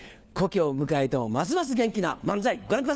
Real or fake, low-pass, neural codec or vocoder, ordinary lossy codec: fake; none; codec, 16 kHz, 4 kbps, FunCodec, trained on LibriTTS, 50 frames a second; none